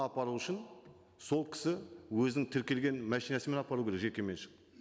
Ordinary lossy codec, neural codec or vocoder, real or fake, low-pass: none; none; real; none